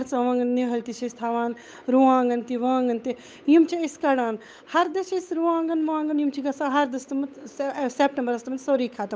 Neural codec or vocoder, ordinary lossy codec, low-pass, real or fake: codec, 16 kHz, 8 kbps, FunCodec, trained on Chinese and English, 25 frames a second; none; none; fake